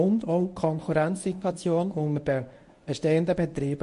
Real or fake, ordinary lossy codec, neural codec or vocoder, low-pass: fake; MP3, 48 kbps; codec, 24 kHz, 0.9 kbps, WavTokenizer, medium speech release version 1; 10.8 kHz